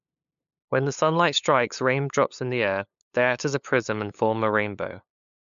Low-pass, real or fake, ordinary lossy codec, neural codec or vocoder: 7.2 kHz; fake; MP3, 64 kbps; codec, 16 kHz, 8 kbps, FunCodec, trained on LibriTTS, 25 frames a second